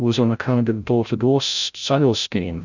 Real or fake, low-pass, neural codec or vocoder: fake; 7.2 kHz; codec, 16 kHz, 0.5 kbps, FreqCodec, larger model